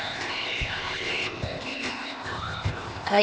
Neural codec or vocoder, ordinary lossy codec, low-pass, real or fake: codec, 16 kHz, 0.8 kbps, ZipCodec; none; none; fake